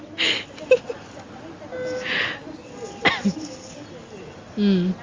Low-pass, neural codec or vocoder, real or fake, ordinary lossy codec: 7.2 kHz; none; real; Opus, 32 kbps